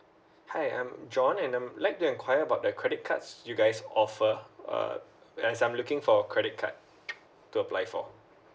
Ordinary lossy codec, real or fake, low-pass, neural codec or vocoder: none; real; none; none